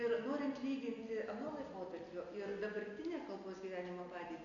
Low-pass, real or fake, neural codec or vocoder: 7.2 kHz; real; none